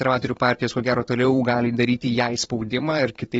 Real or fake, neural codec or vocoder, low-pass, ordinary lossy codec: fake; vocoder, 44.1 kHz, 128 mel bands every 512 samples, BigVGAN v2; 19.8 kHz; AAC, 24 kbps